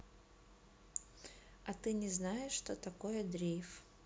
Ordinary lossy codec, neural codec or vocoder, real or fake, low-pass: none; none; real; none